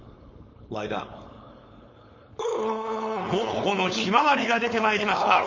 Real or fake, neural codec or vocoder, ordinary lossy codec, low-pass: fake; codec, 16 kHz, 4.8 kbps, FACodec; MP3, 32 kbps; 7.2 kHz